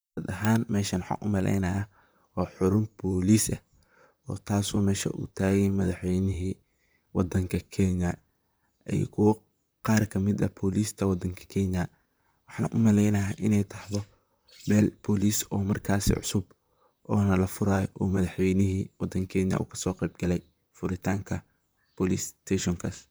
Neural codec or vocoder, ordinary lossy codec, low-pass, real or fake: vocoder, 44.1 kHz, 128 mel bands, Pupu-Vocoder; none; none; fake